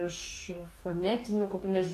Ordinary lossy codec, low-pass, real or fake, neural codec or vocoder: AAC, 64 kbps; 14.4 kHz; fake; codec, 44.1 kHz, 2.6 kbps, DAC